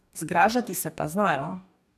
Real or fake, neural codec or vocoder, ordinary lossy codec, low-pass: fake; codec, 44.1 kHz, 2.6 kbps, DAC; none; 14.4 kHz